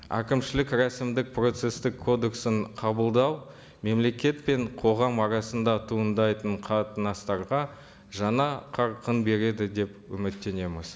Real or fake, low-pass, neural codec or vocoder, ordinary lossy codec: real; none; none; none